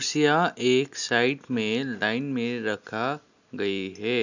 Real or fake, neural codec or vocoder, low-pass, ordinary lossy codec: real; none; 7.2 kHz; none